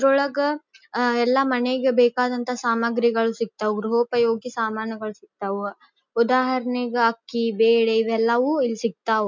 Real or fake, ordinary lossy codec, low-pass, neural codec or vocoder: real; MP3, 64 kbps; 7.2 kHz; none